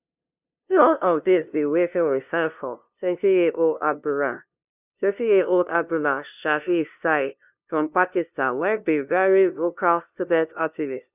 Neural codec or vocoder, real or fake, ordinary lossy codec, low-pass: codec, 16 kHz, 0.5 kbps, FunCodec, trained on LibriTTS, 25 frames a second; fake; none; 3.6 kHz